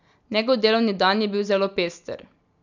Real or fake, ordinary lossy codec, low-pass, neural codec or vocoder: real; none; 7.2 kHz; none